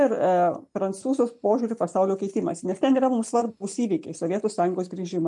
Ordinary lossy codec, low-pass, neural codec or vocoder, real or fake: MP3, 64 kbps; 10.8 kHz; none; real